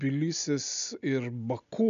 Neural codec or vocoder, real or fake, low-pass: none; real; 7.2 kHz